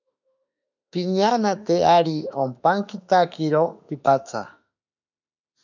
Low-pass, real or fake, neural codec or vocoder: 7.2 kHz; fake; autoencoder, 48 kHz, 32 numbers a frame, DAC-VAE, trained on Japanese speech